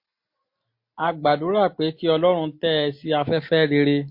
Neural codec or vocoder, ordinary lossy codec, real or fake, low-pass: none; MP3, 32 kbps; real; 5.4 kHz